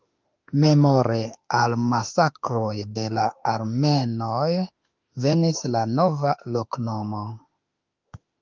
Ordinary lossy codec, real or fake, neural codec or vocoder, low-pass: Opus, 24 kbps; fake; autoencoder, 48 kHz, 32 numbers a frame, DAC-VAE, trained on Japanese speech; 7.2 kHz